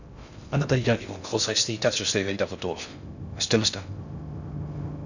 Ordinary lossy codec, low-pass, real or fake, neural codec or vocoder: none; 7.2 kHz; fake; codec, 16 kHz in and 24 kHz out, 0.6 kbps, FocalCodec, streaming, 2048 codes